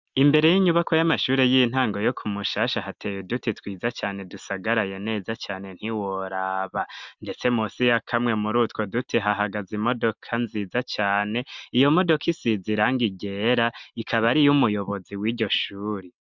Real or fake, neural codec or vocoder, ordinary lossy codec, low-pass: real; none; MP3, 64 kbps; 7.2 kHz